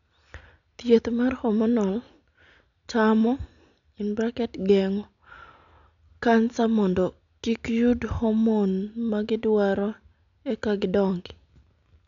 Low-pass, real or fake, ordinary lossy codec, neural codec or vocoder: 7.2 kHz; real; none; none